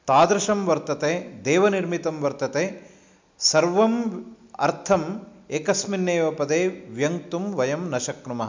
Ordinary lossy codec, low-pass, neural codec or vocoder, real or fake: MP3, 64 kbps; 7.2 kHz; none; real